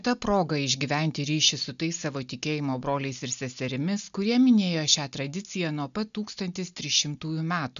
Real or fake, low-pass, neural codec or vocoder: real; 7.2 kHz; none